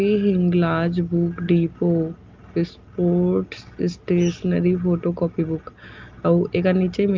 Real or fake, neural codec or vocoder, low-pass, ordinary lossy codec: real; none; 7.2 kHz; Opus, 32 kbps